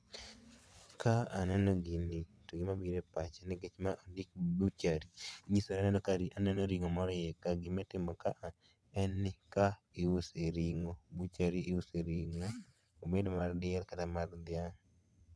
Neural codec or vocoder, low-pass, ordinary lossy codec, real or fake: vocoder, 22.05 kHz, 80 mel bands, WaveNeXt; none; none; fake